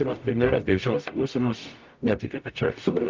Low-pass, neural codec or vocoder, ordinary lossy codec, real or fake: 7.2 kHz; codec, 44.1 kHz, 0.9 kbps, DAC; Opus, 24 kbps; fake